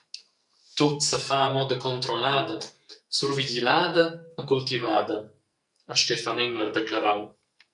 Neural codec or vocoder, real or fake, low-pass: codec, 44.1 kHz, 2.6 kbps, SNAC; fake; 10.8 kHz